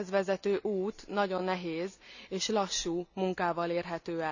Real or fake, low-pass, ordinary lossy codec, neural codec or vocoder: real; 7.2 kHz; none; none